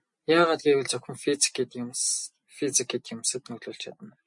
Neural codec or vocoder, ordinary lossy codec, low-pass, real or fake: none; MP3, 48 kbps; 10.8 kHz; real